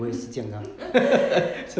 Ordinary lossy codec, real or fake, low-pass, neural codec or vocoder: none; real; none; none